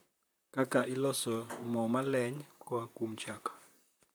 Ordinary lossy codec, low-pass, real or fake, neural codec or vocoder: none; none; fake; vocoder, 44.1 kHz, 128 mel bands, Pupu-Vocoder